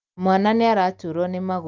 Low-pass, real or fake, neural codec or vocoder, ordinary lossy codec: 7.2 kHz; real; none; Opus, 24 kbps